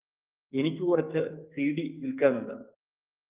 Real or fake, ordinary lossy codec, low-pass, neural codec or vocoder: fake; Opus, 24 kbps; 3.6 kHz; codec, 44.1 kHz, 2.6 kbps, SNAC